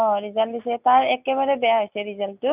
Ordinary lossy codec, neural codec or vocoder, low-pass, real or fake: none; none; 3.6 kHz; real